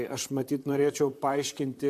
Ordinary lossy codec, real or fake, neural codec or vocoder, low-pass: MP3, 64 kbps; fake; vocoder, 44.1 kHz, 128 mel bands, Pupu-Vocoder; 14.4 kHz